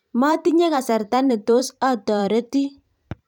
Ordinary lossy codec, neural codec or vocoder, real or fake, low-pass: none; vocoder, 44.1 kHz, 128 mel bands every 512 samples, BigVGAN v2; fake; 19.8 kHz